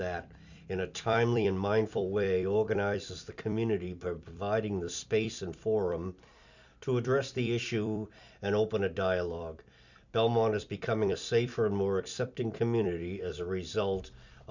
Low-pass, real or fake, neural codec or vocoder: 7.2 kHz; fake; vocoder, 44.1 kHz, 128 mel bands every 256 samples, BigVGAN v2